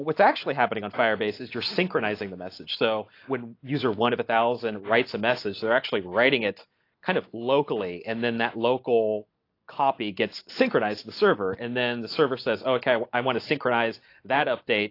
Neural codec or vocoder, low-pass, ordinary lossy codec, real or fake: none; 5.4 kHz; AAC, 32 kbps; real